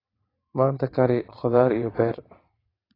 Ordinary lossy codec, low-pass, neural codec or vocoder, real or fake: AAC, 24 kbps; 5.4 kHz; vocoder, 22.05 kHz, 80 mel bands, WaveNeXt; fake